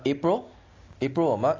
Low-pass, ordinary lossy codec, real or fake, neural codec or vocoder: 7.2 kHz; AAC, 32 kbps; real; none